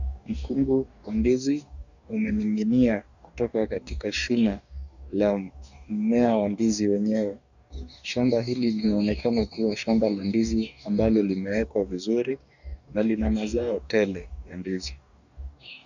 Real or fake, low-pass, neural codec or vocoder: fake; 7.2 kHz; codec, 44.1 kHz, 2.6 kbps, DAC